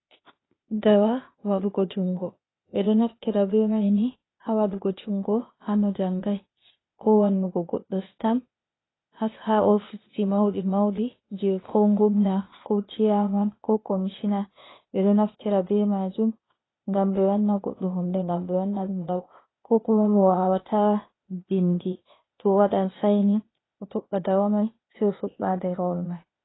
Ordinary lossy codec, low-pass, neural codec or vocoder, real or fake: AAC, 16 kbps; 7.2 kHz; codec, 16 kHz, 0.8 kbps, ZipCodec; fake